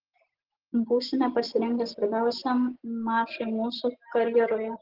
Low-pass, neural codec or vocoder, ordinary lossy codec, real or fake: 5.4 kHz; none; Opus, 16 kbps; real